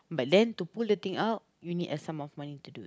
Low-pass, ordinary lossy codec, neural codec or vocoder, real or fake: none; none; none; real